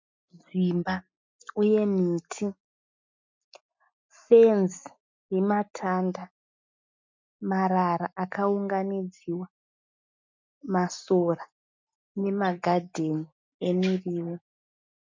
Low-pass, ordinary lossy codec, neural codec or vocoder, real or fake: 7.2 kHz; MP3, 64 kbps; none; real